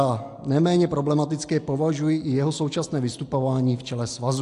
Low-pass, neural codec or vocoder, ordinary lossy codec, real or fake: 10.8 kHz; none; AAC, 64 kbps; real